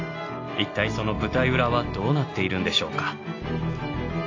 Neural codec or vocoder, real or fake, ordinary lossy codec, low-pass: none; real; AAC, 32 kbps; 7.2 kHz